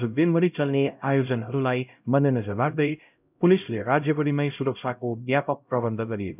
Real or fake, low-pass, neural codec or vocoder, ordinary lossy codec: fake; 3.6 kHz; codec, 16 kHz, 0.5 kbps, X-Codec, HuBERT features, trained on LibriSpeech; none